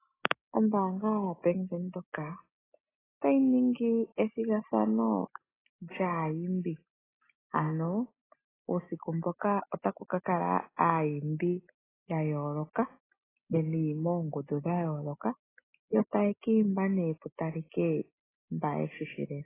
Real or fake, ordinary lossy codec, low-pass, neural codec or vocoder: real; AAC, 16 kbps; 3.6 kHz; none